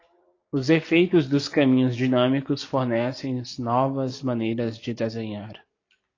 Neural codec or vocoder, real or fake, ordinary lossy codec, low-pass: none; real; AAC, 32 kbps; 7.2 kHz